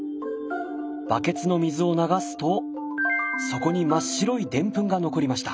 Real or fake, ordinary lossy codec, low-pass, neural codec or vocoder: real; none; none; none